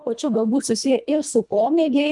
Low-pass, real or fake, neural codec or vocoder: 10.8 kHz; fake; codec, 24 kHz, 1.5 kbps, HILCodec